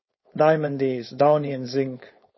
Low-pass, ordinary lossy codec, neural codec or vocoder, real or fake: 7.2 kHz; MP3, 24 kbps; codec, 16 kHz, 4.8 kbps, FACodec; fake